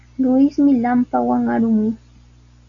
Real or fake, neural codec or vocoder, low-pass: real; none; 7.2 kHz